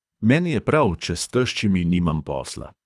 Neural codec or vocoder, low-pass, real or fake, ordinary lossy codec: codec, 24 kHz, 3 kbps, HILCodec; none; fake; none